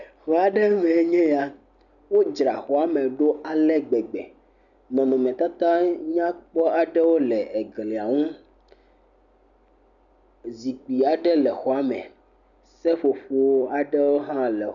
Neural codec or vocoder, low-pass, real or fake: none; 7.2 kHz; real